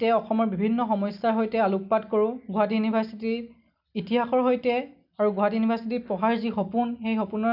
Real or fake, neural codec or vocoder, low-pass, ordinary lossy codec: real; none; 5.4 kHz; none